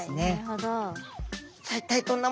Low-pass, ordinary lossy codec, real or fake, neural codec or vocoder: none; none; real; none